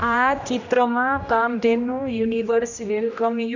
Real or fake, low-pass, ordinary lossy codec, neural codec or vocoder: fake; 7.2 kHz; none; codec, 16 kHz, 1 kbps, X-Codec, HuBERT features, trained on general audio